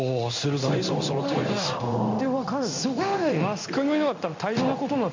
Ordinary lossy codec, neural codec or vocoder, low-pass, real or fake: MP3, 64 kbps; codec, 16 kHz in and 24 kHz out, 1 kbps, XY-Tokenizer; 7.2 kHz; fake